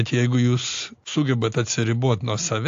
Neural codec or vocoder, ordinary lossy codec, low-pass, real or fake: none; AAC, 48 kbps; 7.2 kHz; real